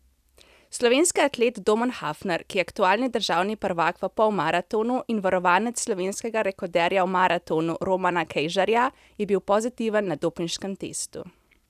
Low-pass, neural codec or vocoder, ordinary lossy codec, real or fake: 14.4 kHz; none; none; real